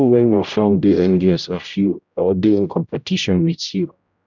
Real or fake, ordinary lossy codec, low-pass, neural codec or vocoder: fake; none; 7.2 kHz; codec, 16 kHz, 0.5 kbps, X-Codec, HuBERT features, trained on general audio